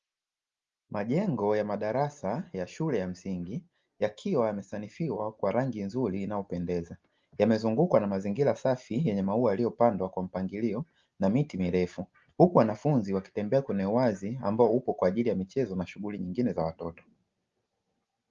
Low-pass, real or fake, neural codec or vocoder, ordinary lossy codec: 7.2 kHz; real; none; Opus, 32 kbps